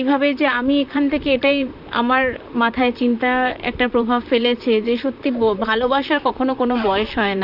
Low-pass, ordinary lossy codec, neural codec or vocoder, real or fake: 5.4 kHz; none; vocoder, 44.1 kHz, 128 mel bands, Pupu-Vocoder; fake